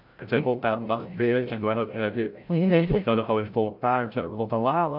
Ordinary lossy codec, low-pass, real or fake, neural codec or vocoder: AAC, 48 kbps; 5.4 kHz; fake; codec, 16 kHz, 0.5 kbps, FreqCodec, larger model